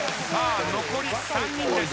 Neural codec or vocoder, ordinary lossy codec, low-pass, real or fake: none; none; none; real